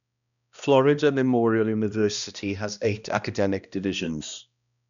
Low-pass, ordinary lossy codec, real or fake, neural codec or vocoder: 7.2 kHz; none; fake; codec, 16 kHz, 1 kbps, X-Codec, HuBERT features, trained on balanced general audio